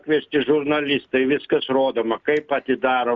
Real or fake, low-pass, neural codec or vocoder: real; 7.2 kHz; none